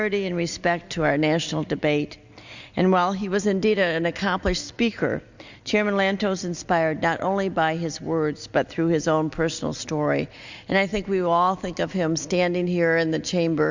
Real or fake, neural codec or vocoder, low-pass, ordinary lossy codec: real; none; 7.2 kHz; Opus, 64 kbps